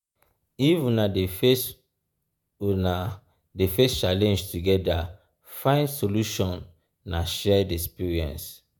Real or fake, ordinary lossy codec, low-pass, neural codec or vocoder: fake; none; none; vocoder, 48 kHz, 128 mel bands, Vocos